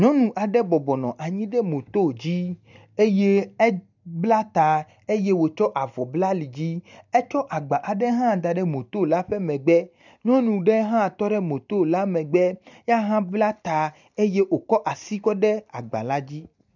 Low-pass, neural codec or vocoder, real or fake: 7.2 kHz; none; real